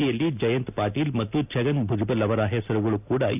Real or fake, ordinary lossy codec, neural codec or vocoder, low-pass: real; none; none; 3.6 kHz